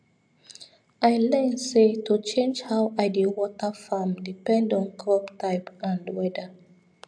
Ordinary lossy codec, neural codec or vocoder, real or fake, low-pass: AAC, 64 kbps; none; real; 9.9 kHz